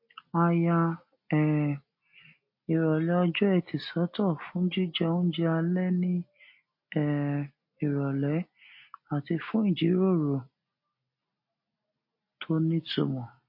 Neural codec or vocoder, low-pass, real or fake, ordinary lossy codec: none; 5.4 kHz; real; MP3, 32 kbps